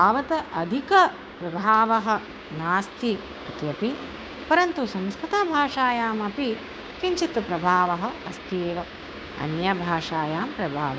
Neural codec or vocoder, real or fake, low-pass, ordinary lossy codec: codec, 16 kHz, 6 kbps, DAC; fake; none; none